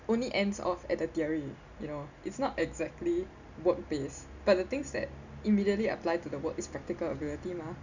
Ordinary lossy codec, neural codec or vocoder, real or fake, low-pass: none; none; real; 7.2 kHz